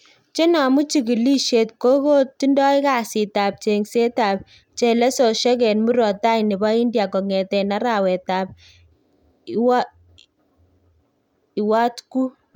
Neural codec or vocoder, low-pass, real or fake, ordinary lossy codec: none; 19.8 kHz; real; none